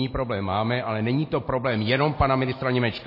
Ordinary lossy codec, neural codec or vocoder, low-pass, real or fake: MP3, 24 kbps; none; 5.4 kHz; real